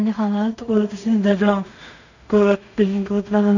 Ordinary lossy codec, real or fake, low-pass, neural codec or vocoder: none; fake; 7.2 kHz; codec, 16 kHz in and 24 kHz out, 0.4 kbps, LongCat-Audio-Codec, two codebook decoder